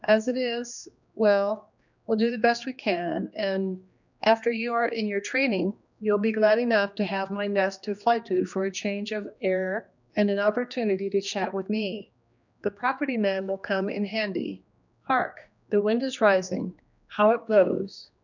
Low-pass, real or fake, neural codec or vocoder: 7.2 kHz; fake; codec, 16 kHz, 2 kbps, X-Codec, HuBERT features, trained on general audio